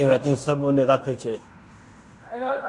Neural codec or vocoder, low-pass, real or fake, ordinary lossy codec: codec, 16 kHz in and 24 kHz out, 0.9 kbps, LongCat-Audio-Codec, fine tuned four codebook decoder; 10.8 kHz; fake; Opus, 64 kbps